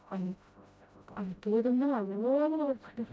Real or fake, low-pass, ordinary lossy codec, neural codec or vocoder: fake; none; none; codec, 16 kHz, 0.5 kbps, FreqCodec, smaller model